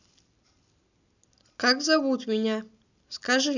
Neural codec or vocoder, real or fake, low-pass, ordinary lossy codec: none; real; 7.2 kHz; none